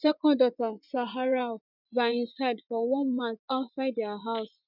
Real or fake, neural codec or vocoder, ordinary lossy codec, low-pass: fake; vocoder, 24 kHz, 100 mel bands, Vocos; none; 5.4 kHz